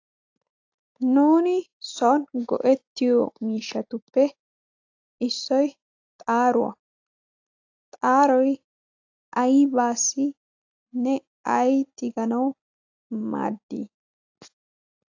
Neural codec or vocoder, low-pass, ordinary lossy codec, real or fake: none; 7.2 kHz; AAC, 48 kbps; real